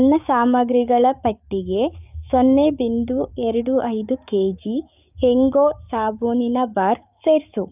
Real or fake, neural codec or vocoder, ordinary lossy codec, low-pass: fake; codec, 16 kHz, 6 kbps, DAC; none; 3.6 kHz